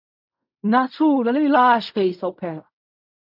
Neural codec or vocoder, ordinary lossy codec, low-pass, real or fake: codec, 16 kHz in and 24 kHz out, 0.4 kbps, LongCat-Audio-Codec, fine tuned four codebook decoder; MP3, 48 kbps; 5.4 kHz; fake